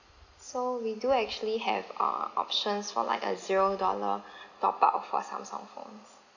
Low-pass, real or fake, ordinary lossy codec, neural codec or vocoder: 7.2 kHz; real; none; none